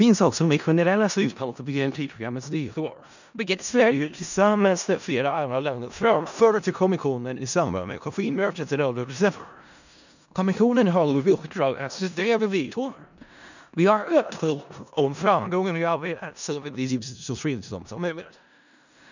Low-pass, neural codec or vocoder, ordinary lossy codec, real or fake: 7.2 kHz; codec, 16 kHz in and 24 kHz out, 0.4 kbps, LongCat-Audio-Codec, four codebook decoder; none; fake